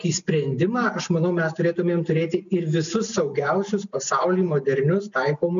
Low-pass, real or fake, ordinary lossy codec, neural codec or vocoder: 7.2 kHz; real; MP3, 96 kbps; none